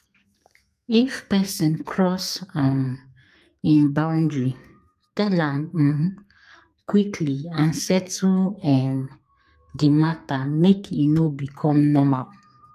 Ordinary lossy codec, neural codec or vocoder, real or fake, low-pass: none; codec, 32 kHz, 1.9 kbps, SNAC; fake; 14.4 kHz